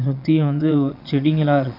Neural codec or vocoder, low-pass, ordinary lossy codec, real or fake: vocoder, 22.05 kHz, 80 mel bands, Vocos; 5.4 kHz; none; fake